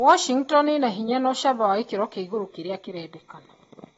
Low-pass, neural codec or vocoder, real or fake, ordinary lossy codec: 19.8 kHz; none; real; AAC, 24 kbps